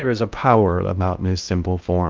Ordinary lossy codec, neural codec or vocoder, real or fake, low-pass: Opus, 24 kbps; codec, 16 kHz in and 24 kHz out, 0.6 kbps, FocalCodec, streaming, 2048 codes; fake; 7.2 kHz